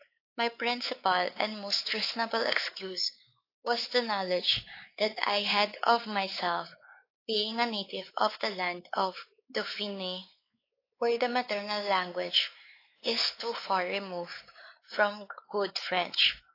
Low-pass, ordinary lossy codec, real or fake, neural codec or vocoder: 5.4 kHz; AAC, 32 kbps; fake; codec, 16 kHz, 4 kbps, X-Codec, WavLM features, trained on Multilingual LibriSpeech